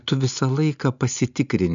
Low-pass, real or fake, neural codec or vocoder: 7.2 kHz; real; none